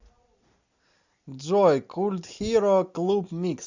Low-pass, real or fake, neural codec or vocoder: 7.2 kHz; real; none